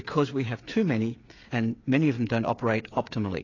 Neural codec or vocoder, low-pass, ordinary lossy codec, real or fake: codec, 16 kHz, 8 kbps, FreqCodec, smaller model; 7.2 kHz; AAC, 32 kbps; fake